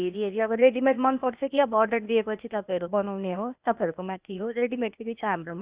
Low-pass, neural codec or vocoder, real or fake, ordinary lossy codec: 3.6 kHz; codec, 16 kHz, 0.8 kbps, ZipCodec; fake; Opus, 64 kbps